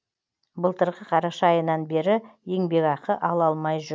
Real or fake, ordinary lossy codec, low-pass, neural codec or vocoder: real; none; none; none